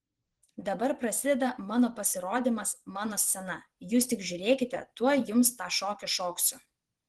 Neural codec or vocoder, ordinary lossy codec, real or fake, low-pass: vocoder, 24 kHz, 100 mel bands, Vocos; Opus, 16 kbps; fake; 10.8 kHz